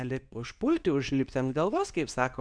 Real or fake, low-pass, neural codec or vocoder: fake; 9.9 kHz; codec, 24 kHz, 0.9 kbps, WavTokenizer, medium speech release version 2